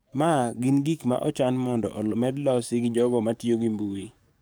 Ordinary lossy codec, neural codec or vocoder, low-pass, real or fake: none; codec, 44.1 kHz, 7.8 kbps, DAC; none; fake